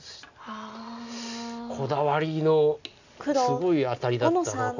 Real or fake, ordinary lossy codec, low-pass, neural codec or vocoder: real; none; 7.2 kHz; none